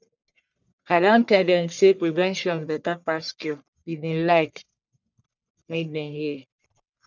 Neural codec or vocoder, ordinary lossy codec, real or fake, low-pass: codec, 44.1 kHz, 1.7 kbps, Pupu-Codec; none; fake; 7.2 kHz